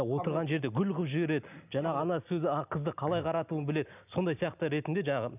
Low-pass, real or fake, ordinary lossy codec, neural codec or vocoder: 3.6 kHz; real; none; none